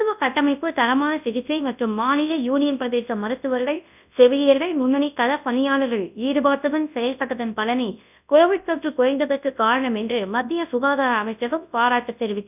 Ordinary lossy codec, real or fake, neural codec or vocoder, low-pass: none; fake; codec, 24 kHz, 0.9 kbps, WavTokenizer, large speech release; 3.6 kHz